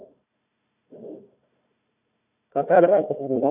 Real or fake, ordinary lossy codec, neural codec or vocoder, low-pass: fake; none; codec, 16 kHz, 1 kbps, FunCodec, trained on Chinese and English, 50 frames a second; 3.6 kHz